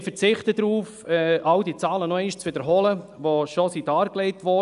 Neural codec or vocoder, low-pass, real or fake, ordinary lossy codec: none; 10.8 kHz; real; AAC, 96 kbps